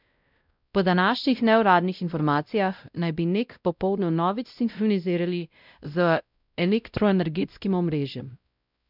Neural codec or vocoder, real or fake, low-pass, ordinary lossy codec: codec, 16 kHz, 0.5 kbps, X-Codec, WavLM features, trained on Multilingual LibriSpeech; fake; 5.4 kHz; none